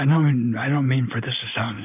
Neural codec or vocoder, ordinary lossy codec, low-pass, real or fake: none; AAC, 32 kbps; 3.6 kHz; real